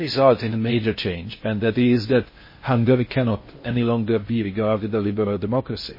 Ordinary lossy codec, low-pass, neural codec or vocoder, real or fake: MP3, 24 kbps; 5.4 kHz; codec, 16 kHz in and 24 kHz out, 0.6 kbps, FocalCodec, streaming, 4096 codes; fake